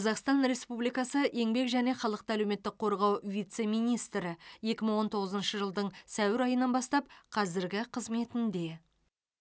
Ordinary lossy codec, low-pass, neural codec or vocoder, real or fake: none; none; none; real